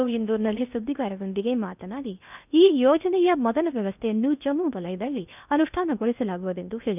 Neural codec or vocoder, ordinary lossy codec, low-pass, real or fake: codec, 16 kHz in and 24 kHz out, 0.6 kbps, FocalCodec, streaming, 2048 codes; none; 3.6 kHz; fake